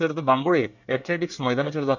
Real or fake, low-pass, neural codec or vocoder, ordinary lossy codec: fake; 7.2 kHz; codec, 24 kHz, 1 kbps, SNAC; none